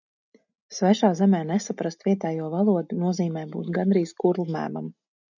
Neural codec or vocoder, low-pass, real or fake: none; 7.2 kHz; real